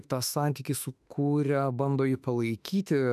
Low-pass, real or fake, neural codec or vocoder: 14.4 kHz; fake; autoencoder, 48 kHz, 32 numbers a frame, DAC-VAE, trained on Japanese speech